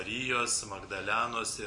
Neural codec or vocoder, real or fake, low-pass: none; real; 10.8 kHz